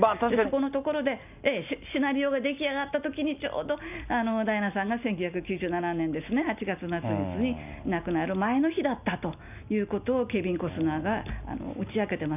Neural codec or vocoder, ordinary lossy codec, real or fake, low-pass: none; none; real; 3.6 kHz